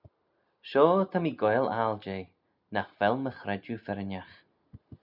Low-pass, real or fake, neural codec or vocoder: 5.4 kHz; real; none